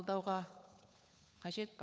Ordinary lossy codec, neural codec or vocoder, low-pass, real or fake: none; codec, 16 kHz, 8 kbps, FunCodec, trained on Chinese and English, 25 frames a second; none; fake